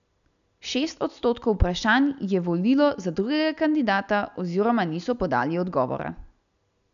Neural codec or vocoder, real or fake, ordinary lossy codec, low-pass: none; real; none; 7.2 kHz